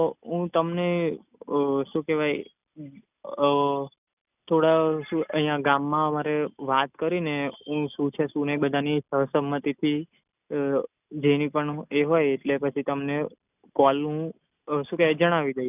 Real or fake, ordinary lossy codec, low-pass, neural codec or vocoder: real; none; 3.6 kHz; none